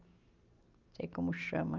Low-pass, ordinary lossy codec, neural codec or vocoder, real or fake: 7.2 kHz; Opus, 24 kbps; none; real